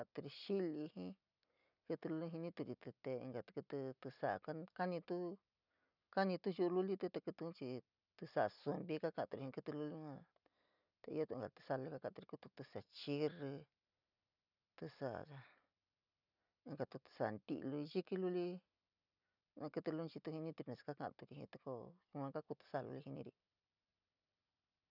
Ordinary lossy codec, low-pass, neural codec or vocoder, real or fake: none; 5.4 kHz; none; real